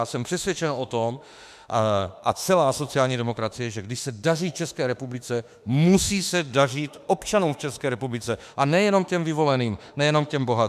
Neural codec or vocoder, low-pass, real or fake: autoencoder, 48 kHz, 32 numbers a frame, DAC-VAE, trained on Japanese speech; 14.4 kHz; fake